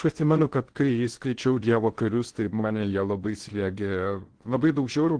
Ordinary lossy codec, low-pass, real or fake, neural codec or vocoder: Opus, 16 kbps; 9.9 kHz; fake; codec, 16 kHz in and 24 kHz out, 0.6 kbps, FocalCodec, streaming, 2048 codes